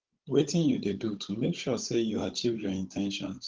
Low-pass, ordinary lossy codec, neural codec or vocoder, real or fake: 7.2 kHz; Opus, 16 kbps; codec, 16 kHz, 16 kbps, FunCodec, trained on Chinese and English, 50 frames a second; fake